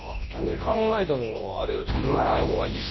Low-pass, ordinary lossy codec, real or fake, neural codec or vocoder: 7.2 kHz; MP3, 24 kbps; fake; codec, 24 kHz, 0.9 kbps, WavTokenizer, large speech release